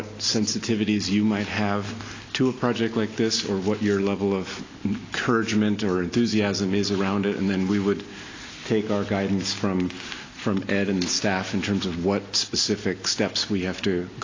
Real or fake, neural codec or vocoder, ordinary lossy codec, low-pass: real; none; AAC, 32 kbps; 7.2 kHz